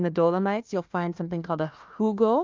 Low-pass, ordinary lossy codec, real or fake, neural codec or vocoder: 7.2 kHz; Opus, 24 kbps; fake; codec, 16 kHz, 1 kbps, FunCodec, trained on Chinese and English, 50 frames a second